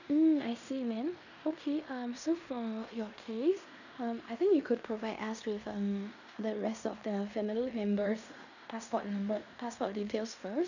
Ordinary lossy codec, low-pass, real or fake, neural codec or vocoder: none; 7.2 kHz; fake; codec, 16 kHz in and 24 kHz out, 0.9 kbps, LongCat-Audio-Codec, fine tuned four codebook decoder